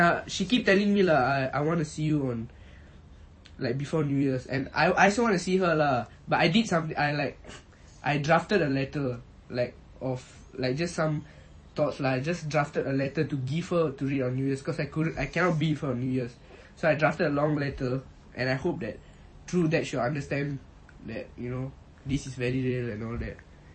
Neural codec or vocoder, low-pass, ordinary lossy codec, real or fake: vocoder, 44.1 kHz, 128 mel bands every 256 samples, BigVGAN v2; 9.9 kHz; MP3, 32 kbps; fake